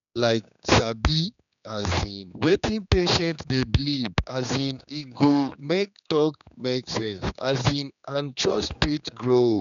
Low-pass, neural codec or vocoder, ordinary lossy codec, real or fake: 7.2 kHz; codec, 16 kHz, 2 kbps, X-Codec, HuBERT features, trained on general audio; none; fake